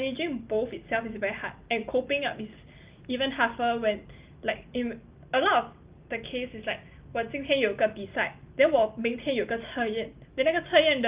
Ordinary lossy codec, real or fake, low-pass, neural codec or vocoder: Opus, 32 kbps; real; 3.6 kHz; none